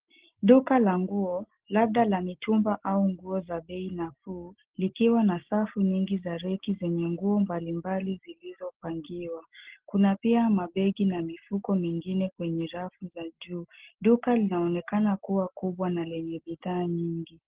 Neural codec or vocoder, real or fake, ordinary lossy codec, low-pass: none; real; Opus, 16 kbps; 3.6 kHz